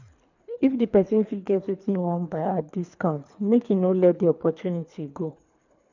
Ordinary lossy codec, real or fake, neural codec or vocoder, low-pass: none; fake; codec, 24 kHz, 3 kbps, HILCodec; 7.2 kHz